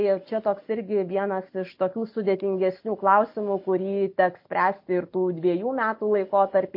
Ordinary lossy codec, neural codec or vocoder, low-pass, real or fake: MP3, 32 kbps; none; 5.4 kHz; real